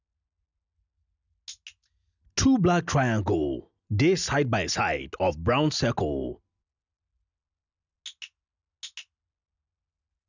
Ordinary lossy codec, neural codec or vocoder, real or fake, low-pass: none; none; real; 7.2 kHz